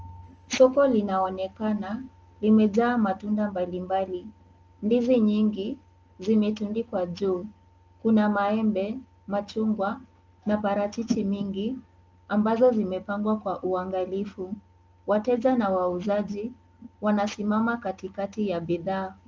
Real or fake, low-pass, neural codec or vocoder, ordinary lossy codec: real; 7.2 kHz; none; Opus, 24 kbps